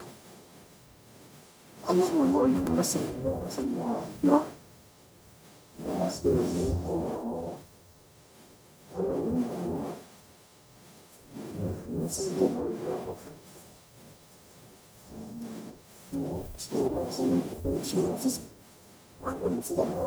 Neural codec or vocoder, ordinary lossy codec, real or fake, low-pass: codec, 44.1 kHz, 0.9 kbps, DAC; none; fake; none